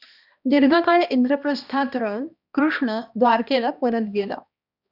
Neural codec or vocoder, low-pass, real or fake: codec, 16 kHz, 1 kbps, X-Codec, HuBERT features, trained on balanced general audio; 5.4 kHz; fake